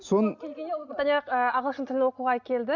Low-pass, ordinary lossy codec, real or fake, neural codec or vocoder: 7.2 kHz; none; real; none